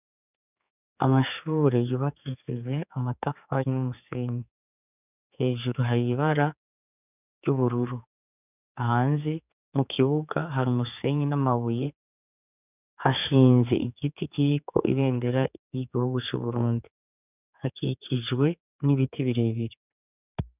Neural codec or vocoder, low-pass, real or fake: codec, 16 kHz, 4 kbps, X-Codec, HuBERT features, trained on general audio; 3.6 kHz; fake